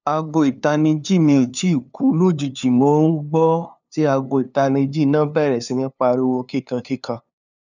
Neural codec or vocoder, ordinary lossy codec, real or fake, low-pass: codec, 16 kHz, 2 kbps, FunCodec, trained on LibriTTS, 25 frames a second; none; fake; 7.2 kHz